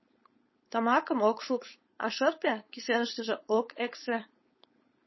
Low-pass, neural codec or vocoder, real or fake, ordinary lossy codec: 7.2 kHz; codec, 16 kHz, 4.8 kbps, FACodec; fake; MP3, 24 kbps